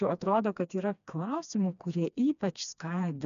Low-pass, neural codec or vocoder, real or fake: 7.2 kHz; codec, 16 kHz, 2 kbps, FreqCodec, smaller model; fake